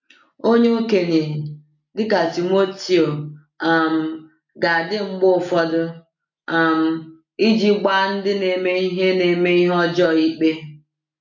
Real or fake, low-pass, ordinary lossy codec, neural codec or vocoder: real; 7.2 kHz; MP3, 48 kbps; none